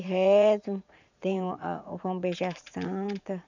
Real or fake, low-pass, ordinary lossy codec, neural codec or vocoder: fake; 7.2 kHz; none; vocoder, 44.1 kHz, 80 mel bands, Vocos